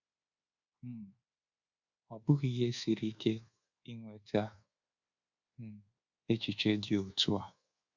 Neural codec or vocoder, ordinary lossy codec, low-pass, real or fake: codec, 24 kHz, 1.2 kbps, DualCodec; Opus, 64 kbps; 7.2 kHz; fake